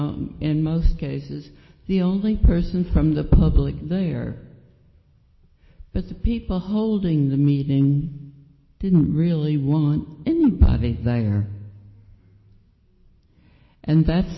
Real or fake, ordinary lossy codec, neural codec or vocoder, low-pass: real; MP3, 24 kbps; none; 7.2 kHz